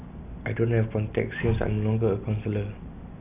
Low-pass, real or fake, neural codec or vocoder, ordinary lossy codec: 3.6 kHz; real; none; none